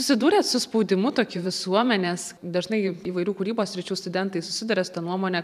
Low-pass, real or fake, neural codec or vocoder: 14.4 kHz; fake; vocoder, 44.1 kHz, 128 mel bands every 512 samples, BigVGAN v2